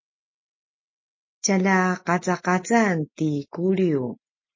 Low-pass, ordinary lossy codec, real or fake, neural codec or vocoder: 7.2 kHz; MP3, 32 kbps; real; none